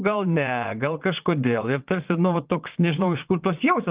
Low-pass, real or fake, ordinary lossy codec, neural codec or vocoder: 3.6 kHz; fake; Opus, 32 kbps; vocoder, 22.05 kHz, 80 mel bands, Vocos